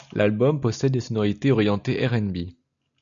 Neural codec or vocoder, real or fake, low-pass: none; real; 7.2 kHz